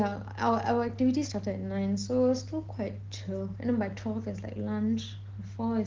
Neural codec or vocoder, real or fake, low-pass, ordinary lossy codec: none; real; 7.2 kHz; Opus, 16 kbps